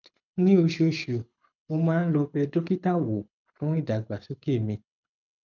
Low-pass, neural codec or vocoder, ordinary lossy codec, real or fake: 7.2 kHz; codec, 24 kHz, 6 kbps, HILCodec; none; fake